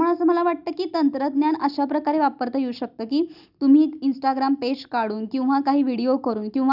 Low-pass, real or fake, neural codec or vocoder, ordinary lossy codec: 5.4 kHz; real; none; none